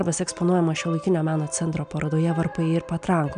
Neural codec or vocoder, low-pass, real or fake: none; 9.9 kHz; real